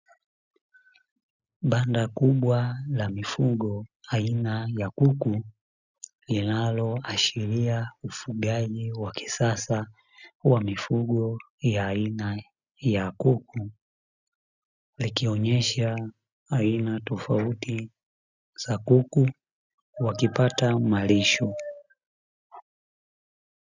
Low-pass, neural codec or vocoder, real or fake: 7.2 kHz; none; real